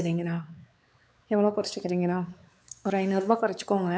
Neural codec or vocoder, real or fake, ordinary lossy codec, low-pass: codec, 16 kHz, 4 kbps, X-Codec, WavLM features, trained on Multilingual LibriSpeech; fake; none; none